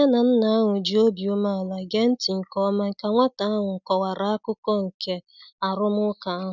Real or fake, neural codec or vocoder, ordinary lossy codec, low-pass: real; none; none; 7.2 kHz